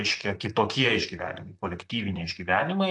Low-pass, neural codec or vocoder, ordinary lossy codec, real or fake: 10.8 kHz; vocoder, 44.1 kHz, 128 mel bands, Pupu-Vocoder; AAC, 64 kbps; fake